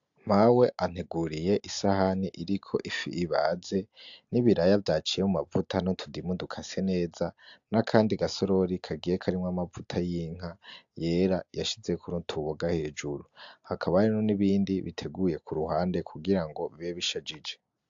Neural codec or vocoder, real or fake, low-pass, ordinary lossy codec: none; real; 7.2 kHz; AAC, 64 kbps